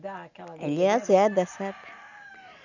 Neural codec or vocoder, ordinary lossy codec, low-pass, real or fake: none; none; 7.2 kHz; real